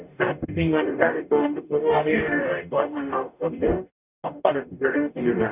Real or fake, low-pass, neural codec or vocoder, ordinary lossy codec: fake; 3.6 kHz; codec, 44.1 kHz, 0.9 kbps, DAC; none